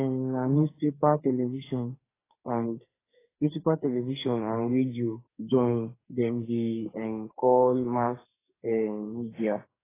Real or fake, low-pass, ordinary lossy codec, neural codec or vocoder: fake; 3.6 kHz; AAC, 16 kbps; codec, 44.1 kHz, 2.6 kbps, SNAC